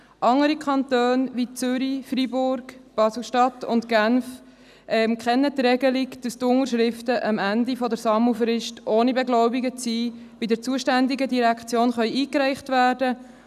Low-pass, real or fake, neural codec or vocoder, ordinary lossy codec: 14.4 kHz; real; none; none